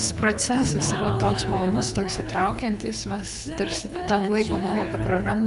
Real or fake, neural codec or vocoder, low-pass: fake; codec, 24 kHz, 3 kbps, HILCodec; 10.8 kHz